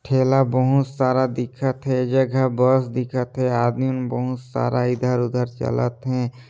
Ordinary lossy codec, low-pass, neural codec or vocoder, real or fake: none; none; none; real